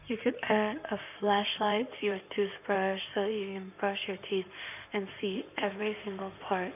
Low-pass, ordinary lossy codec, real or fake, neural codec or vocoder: 3.6 kHz; none; fake; codec, 16 kHz in and 24 kHz out, 2.2 kbps, FireRedTTS-2 codec